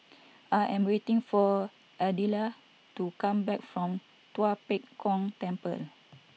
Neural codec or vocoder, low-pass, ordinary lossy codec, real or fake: none; none; none; real